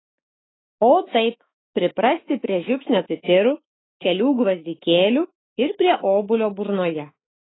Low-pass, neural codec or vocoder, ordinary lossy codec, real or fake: 7.2 kHz; autoencoder, 48 kHz, 128 numbers a frame, DAC-VAE, trained on Japanese speech; AAC, 16 kbps; fake